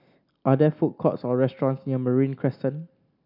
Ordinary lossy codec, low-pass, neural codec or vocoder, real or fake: none; 5.4 kHz; none; real